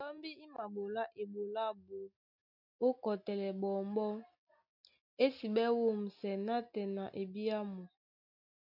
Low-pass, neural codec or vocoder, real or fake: 5.4 kHz; none; real